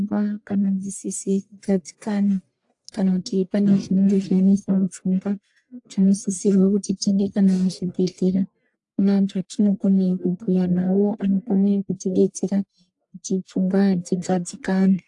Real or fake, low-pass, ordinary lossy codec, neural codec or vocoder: fake; 10.8 kHz; AAC, 64 kbps; codec, 44.1 kHz, 1.7 kbps, Pupu-Codec